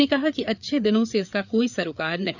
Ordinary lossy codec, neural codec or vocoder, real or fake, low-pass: none; codec, 16 kHz, 4 kbps, FreqCodec, larger model; fake; 7.2 kHz